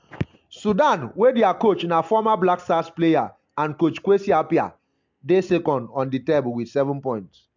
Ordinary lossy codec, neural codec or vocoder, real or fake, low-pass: MP3, 64 kbps; none; real; 7.2 kHz